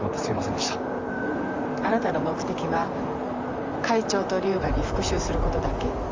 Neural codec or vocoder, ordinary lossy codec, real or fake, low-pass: vocoder, 44.1 kHz, 128 mel bands, Pupu-Vocoder; Opus, 32 kbps; fake; 7.2 kHz